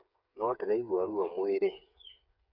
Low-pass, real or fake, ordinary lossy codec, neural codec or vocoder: 5.4 kHz; fake; none; codec, 16 kHz, 8 kbps, FreqCodec, smaller model